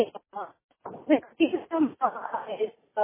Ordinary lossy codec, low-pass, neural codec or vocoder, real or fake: MP3, 16 kbps; 3.6 kHz; none; real